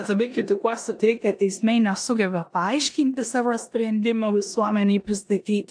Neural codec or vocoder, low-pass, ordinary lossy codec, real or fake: codec, 16 kHz in and 24 kHz out, 0.9 kbps, LongCat-Audio-Codec, four codebook decoder; 9.9 kHz; AAC, 64 kbps; fake